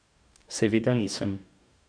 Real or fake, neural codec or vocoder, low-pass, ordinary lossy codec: fake; codec, 44.1 kHz, 2.6 kbps, DAC; 9.9 kHz; none